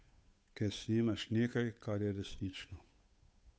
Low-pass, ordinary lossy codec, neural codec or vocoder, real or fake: none; none; codec, 16 kHz, 8 kbps, FunCodec, trained on Chinese and English, 25 frames a second; fake